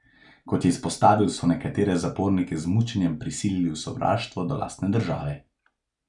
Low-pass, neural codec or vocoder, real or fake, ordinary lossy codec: 10.8 kHz; none; real; none